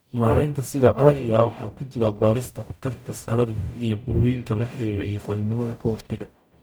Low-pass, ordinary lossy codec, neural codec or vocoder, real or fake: none; none; codec, 44.1 kHz, 0.9 kbps, DAC; fake